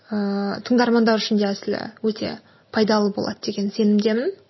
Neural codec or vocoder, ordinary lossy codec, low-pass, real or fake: none; MP3, 24 kbps; 7.2 kHz; real